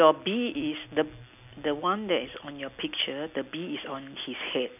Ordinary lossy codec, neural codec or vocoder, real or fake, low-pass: none; none; real; 3.6 kHz